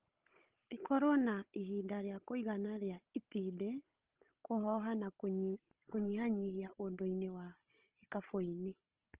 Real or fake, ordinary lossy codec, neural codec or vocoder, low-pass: fake; Opus, 16 kbps; codec, 16 kHz, 16 kbps, FunCodec, trained on Chinese and English, 50 frames a second; 3.6 kHz